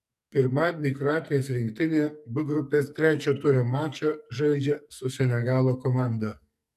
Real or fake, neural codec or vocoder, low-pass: fake; codec, 44.1 kHz, 2.6 kbps, SNAC; 14.4 kHz